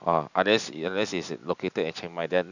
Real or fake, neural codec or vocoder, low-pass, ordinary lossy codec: fake; vocoder, 44.1 kHz, 128 mel bands every 512 samples, BigVGAN v2; 7.2 kHz; none